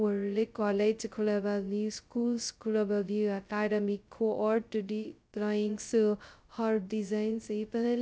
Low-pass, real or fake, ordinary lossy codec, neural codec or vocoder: none; fake; none; codec, 16 kHz, 0.2 kbps, FocalCodec